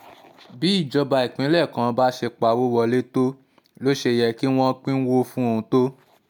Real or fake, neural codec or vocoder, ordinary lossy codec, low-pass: real; none; none; 19.8 kHz